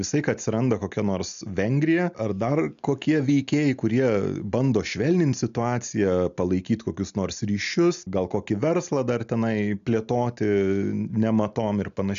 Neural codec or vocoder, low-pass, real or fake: none; 7.2 kHz; real